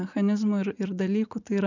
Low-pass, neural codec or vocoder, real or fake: 7.2 kHz; vocoder, 44.1 kHz, 80 mel bands, Vocos; fake